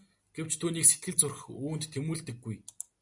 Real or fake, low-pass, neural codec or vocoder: real; 10.8 kHz; none